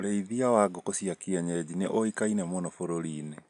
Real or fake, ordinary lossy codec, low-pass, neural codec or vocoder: real; none; 10.8 kHz; none